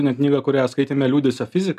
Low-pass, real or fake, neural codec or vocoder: 14.4 kHz; real; none